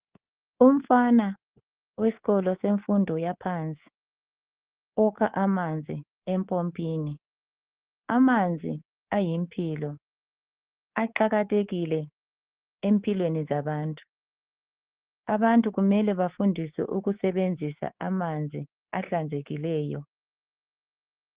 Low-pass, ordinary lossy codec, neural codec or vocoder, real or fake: 3.6 kHz; Opus, 16 kbps; codec, 24 kHz, 3.1 kbps, DualCodec; fake